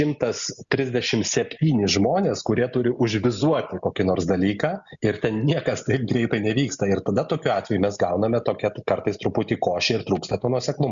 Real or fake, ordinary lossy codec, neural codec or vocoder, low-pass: real; Opus, 64 kbps; none; 7.2 kHz